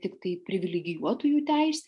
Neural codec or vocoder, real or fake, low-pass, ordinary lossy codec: none; real; 10.8 kHz; MP3, 64 kbps